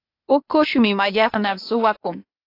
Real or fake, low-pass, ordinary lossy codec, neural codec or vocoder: fake; 5.4 kHz; AAC, 32 kbps; codec, 16 kHz, 0.8 kbps, ZipCodec